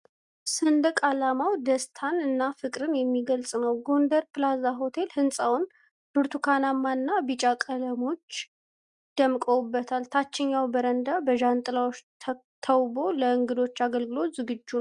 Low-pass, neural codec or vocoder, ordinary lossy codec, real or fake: 10.8 kHz; none; Opus, 64 kbps; real